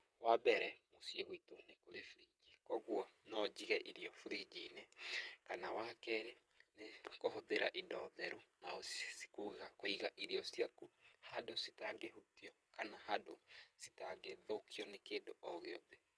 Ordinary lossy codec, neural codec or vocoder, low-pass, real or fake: none; vocoder, 22.05 kHz, 80 mel bands, WaveNeXt; none; fake